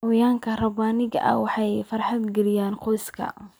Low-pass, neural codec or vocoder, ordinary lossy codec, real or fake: none; none; none; real